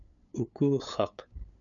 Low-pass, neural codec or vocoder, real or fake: 7.2 kHz; codec, 16 kHz, 8 kbps, FunCodec, trained on LibriTTS, 25 frames a second; fake